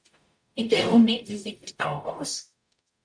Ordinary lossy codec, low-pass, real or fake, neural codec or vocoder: MP3, 64 kbps; 9.9 kHz; fake; codec, 44.1 kHz, 0.9 kbps, DAC